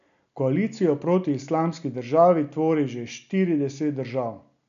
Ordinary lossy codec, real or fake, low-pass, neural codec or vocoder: none; real; 7.2 kHz; none